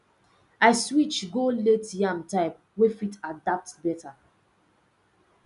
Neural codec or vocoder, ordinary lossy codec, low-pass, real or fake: none; none; 10.8 kHz; real